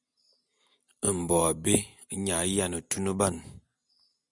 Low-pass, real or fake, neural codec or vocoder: 10.8 kHz; real; none